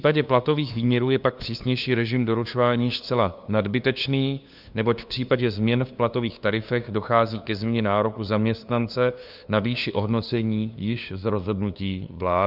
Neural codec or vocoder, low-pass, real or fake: codec, 16 kHz, 2 kbps, FunCodec, trained on LibriTTS, 25 frames a second; 5.4 kHz; fake